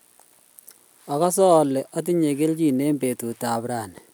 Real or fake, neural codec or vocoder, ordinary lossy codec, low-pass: real; none; none; none